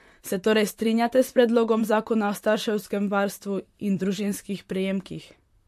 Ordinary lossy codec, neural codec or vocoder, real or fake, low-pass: MP3, 64 kbps; vocoder, 44.1 kHz, 128 mel bands, Pupu-Vocoder; fake; 14.4 kHz